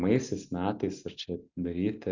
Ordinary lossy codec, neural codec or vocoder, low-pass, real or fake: Opus, 64 kbps; none; 7.2 kHz; real